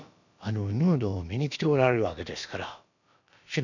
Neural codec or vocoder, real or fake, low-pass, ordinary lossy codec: codec, 16 kHz, about 1 kbps, DyCAST, with the encoder's durations; fake; 7.2 kHz; none